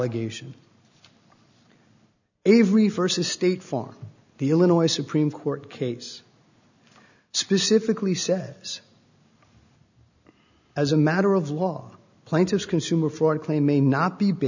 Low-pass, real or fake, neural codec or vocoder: 7.2 kHz; real; none